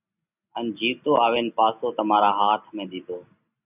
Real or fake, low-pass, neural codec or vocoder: real; 3.6 kHz; none